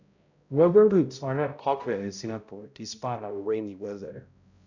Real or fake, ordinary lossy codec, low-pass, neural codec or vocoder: fake; none; 7.2 kHz; codec, 16 kHz, 0.5 kbps, X-Codec, HuBERT features, trained on balanced general audio